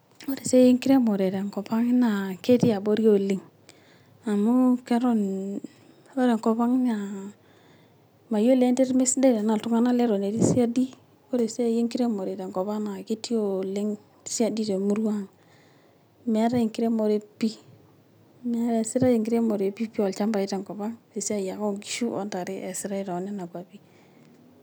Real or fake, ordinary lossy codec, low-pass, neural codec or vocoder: real; none; none; none